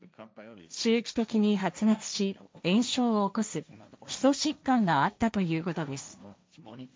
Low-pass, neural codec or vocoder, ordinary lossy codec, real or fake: none; codec, 16 kHz, 1.1 kbps, Voila-Tokenizer; none; fake